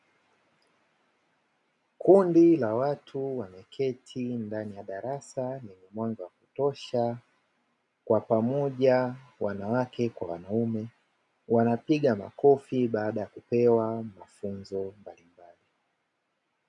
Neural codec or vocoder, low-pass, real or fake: none; 10.8 kHz; real